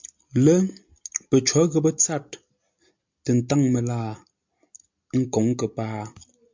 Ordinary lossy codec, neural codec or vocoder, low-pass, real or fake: MP3, 64 kbps; none; 7.2 kHz; real